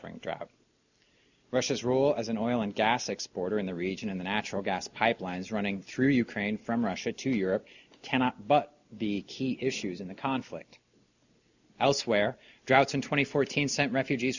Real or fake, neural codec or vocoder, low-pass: real; none; 7.2 kHz